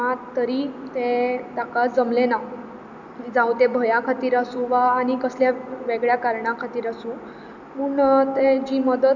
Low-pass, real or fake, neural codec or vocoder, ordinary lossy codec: 7.2 kHz; real; none; none